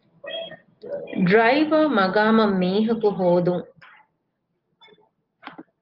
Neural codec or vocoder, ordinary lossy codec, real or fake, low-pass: none; Opus, 24 kbps; real; 5.4 kHz